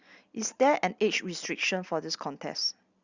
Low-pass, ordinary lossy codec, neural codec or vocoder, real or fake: 7.2 kHz; Opus, 64 kbps; none; real